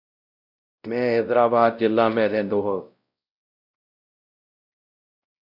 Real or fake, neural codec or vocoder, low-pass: fake; codec, 16 kHz, 0.5 kbps, X-Codec, WavLM features, trained on Multilingual LibriSpeech; 5.4 kHz